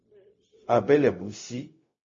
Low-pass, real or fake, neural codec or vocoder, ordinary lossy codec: 7.2 kHz; fake; codec, 16 kHz, 0.4 kbps, LongCat-Audio-Codec; MP3, 32 kbps